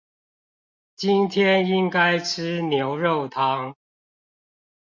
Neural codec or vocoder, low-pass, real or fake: none; 7.2 kHz; real